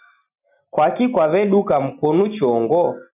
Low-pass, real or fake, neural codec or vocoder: 3.6 kHz; real; none